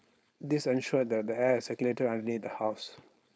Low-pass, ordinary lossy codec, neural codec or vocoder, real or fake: none; none; codec, 16 kHz, 4.8 kbps, FACodec; fake